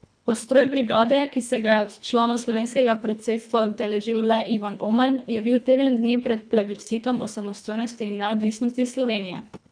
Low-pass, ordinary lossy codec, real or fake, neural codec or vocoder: 9.9 kHz; none; fake; codec, 24 kHz, 1.5 kbps, HILCodec